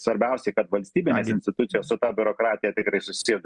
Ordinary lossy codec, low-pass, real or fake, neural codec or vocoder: Opus, 64 kbps; 10.8 kHz; real; none